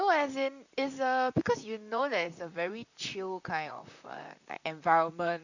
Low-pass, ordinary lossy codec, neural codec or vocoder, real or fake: 7.2 kHz; none; vocoder, 44.1 kHz, 128 mel bands, Pupu-Vocoder; fake